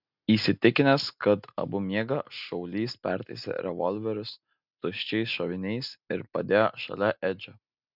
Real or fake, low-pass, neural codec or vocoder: real; 5.4 kHz; none